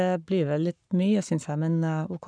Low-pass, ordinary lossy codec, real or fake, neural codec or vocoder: 9.9 kHz; none; fake; codec, 44.1 kHz, 7.8 kbps, Pupu-Codec